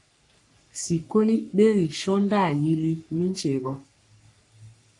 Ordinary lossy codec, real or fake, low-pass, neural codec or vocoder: AAC, 64 kbps; fake; 10.8 kHz; codec, 44.1 kHz, 3.4 kbps, Pupu-Codec